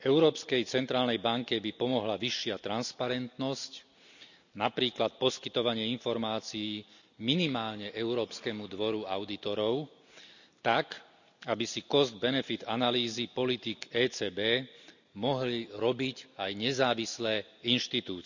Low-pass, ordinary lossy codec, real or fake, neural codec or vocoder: 7.2 kHz; none; real; none